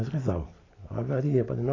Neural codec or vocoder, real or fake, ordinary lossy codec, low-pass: none; real; AAC, 32 kbps; 7.2 kHz